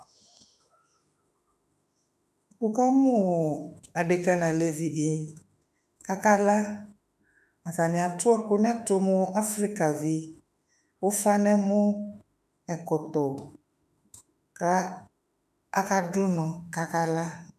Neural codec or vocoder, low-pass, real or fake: autoencoder, 48 kHz, 32 numbers a frame, DAC-VAE, trained on Japanese speech; 14.4 kHz; fake